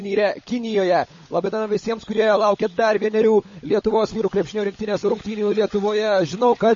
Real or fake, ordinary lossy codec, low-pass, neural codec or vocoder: fake; MP3, 32 kbps; 7.2 kHz; codec, 16 kHz, 16 kbps, FunCodec, trained on LibriTTS, 50 frames a second